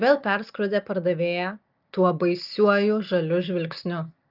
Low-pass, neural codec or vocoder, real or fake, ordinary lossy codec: 5.4 kHz; none; real; Opus, 24 kbps